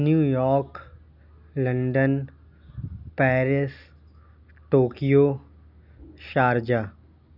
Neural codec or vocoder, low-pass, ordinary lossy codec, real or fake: none; 5.4 kHz; none; real